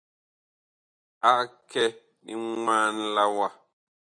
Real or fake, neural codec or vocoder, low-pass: real; none; 9.9 kHz